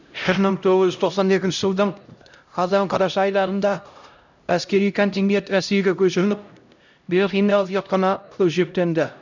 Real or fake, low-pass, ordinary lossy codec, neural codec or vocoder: fake; 7.2 kHz; none; codec, 16 kHz, 0.5 kbps, X-Codec, HuBERT features, trained on LibriSpeech